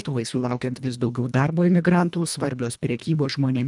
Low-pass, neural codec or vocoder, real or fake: 10.8 kHz; codec, 24 kHz, 1.5 kbps, HILCodec; fake